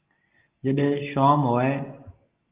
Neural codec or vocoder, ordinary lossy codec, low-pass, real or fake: none; Opus, 32 kbps; 3.6 kHz; real